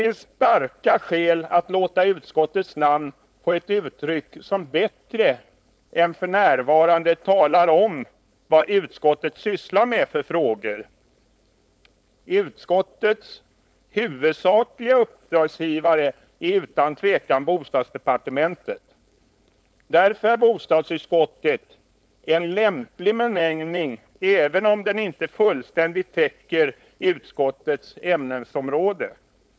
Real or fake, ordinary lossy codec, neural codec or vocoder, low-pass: fake; none; codec, 16 kHz, 4.8 kbps, FACodec; none